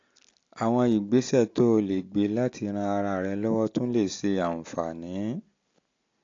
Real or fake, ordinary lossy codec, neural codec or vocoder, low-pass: real; AAC, 48 kbps; none; 7.2 kHz